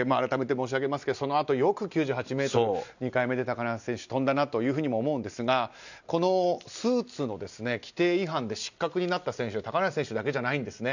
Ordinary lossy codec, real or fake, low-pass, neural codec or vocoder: none; real; 7.2 kHz; none